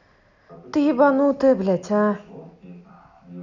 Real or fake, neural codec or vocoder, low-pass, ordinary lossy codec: real; none; 7.2 kHz; none